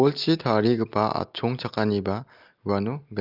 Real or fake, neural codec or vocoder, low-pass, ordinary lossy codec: real; none; 5.4 kHz; Opus, 24 kbps